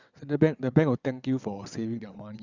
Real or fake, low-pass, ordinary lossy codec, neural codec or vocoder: fake; 7.2 kHz; Opus, 64 kbps; vocoder, 22.05 kHz, 80 mel bands, WaveNeXt